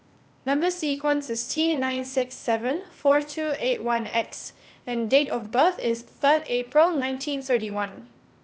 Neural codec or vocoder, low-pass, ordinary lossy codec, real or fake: codec, 16 kHz, 0.8 kbps, ZipCodec; none; none; fake